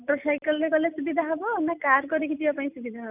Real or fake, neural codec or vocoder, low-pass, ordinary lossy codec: real; none; 3.6 kHz; none